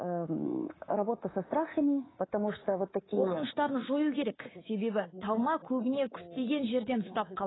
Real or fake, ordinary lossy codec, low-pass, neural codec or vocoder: fake; AAC, 16 kbps; 7.2 kHz; codec, 24 kHz, 3.1 kbps, DualCodec